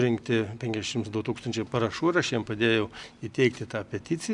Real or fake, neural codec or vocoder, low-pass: real; none; 10.8 kHz